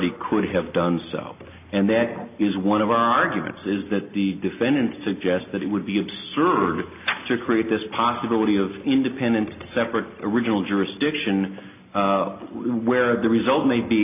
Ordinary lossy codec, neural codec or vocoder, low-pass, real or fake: AAC, 32 kbps; none; 3.6 kHz; real